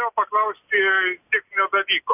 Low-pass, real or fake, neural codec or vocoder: 3.6 kHz; real; none